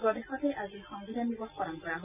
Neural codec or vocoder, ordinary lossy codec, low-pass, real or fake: none; none; 3.6 kHz; real